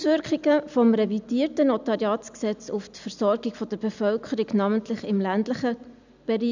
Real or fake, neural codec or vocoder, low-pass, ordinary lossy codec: real; none; 7.2 kHz; none